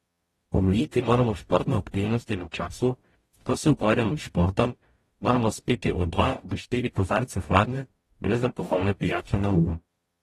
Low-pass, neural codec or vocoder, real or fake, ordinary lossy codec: 19.8 kHz; codec, 44.1 kHz, 0.9 kbps, DAC; fake; AAC, 32 kbps